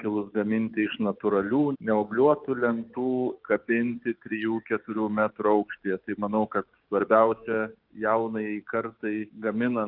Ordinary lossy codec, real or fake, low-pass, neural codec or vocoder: Opus, 24 kbps; fake; 5.4 kHz; codec, 16 kHz, 6 kbps, DAC